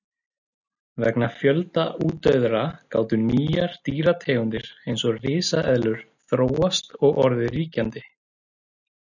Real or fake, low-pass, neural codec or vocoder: real; 7.2 kHz; none